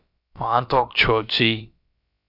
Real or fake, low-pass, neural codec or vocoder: fake; 5.4 kHz; codec, 16 kHz, about 1 kbps, DyCAST, with the encoder's durations